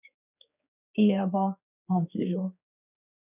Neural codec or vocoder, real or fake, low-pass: codec, 16 kHz, 4 kbps, X-Codec, HuBERT features, trained on general audio; fake; 3.6 kHz